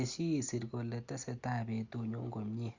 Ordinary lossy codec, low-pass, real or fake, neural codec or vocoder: Opus, 64 kbps; 7.2 kHz; real; none